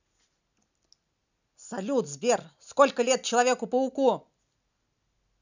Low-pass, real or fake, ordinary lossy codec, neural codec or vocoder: 7.2 kHz; real; none; none